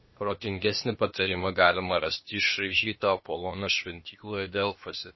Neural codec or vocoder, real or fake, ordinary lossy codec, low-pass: codec, 16 kHz, 0.8 kbps, ZipCodec; fake; MP3, 24 kbps; 7.2 kHz